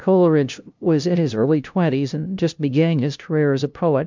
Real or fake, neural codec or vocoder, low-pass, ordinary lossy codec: fake; codec, 16 kHz, 0.5 kbps, FunCodec, trained on LibriTTS, 25 frames a second; 7.2 kHz; MP3, 64 kbps